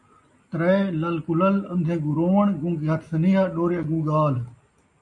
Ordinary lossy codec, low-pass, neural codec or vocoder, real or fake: MP3, 48 kbps; 10.8 kHz; none; real